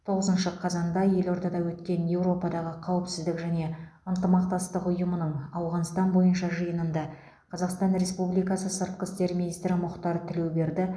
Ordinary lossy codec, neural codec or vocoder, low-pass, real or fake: none; none; none; real